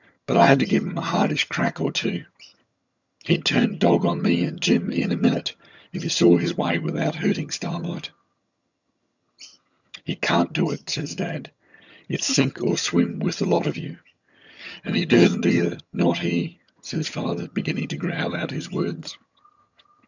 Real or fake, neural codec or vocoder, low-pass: fake; vocoder, 22.05 kHz, 80 mel bands, HiFi-GAN; 7.2 kHz